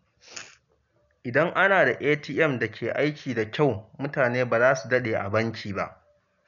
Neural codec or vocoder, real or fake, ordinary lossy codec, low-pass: none; real; none; 7.2 kHz